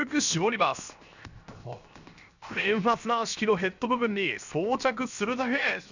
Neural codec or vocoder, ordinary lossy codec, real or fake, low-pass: codec, 16 kHz, 0.7 kbps, FocalCodec; none; fake; 7.2 kHz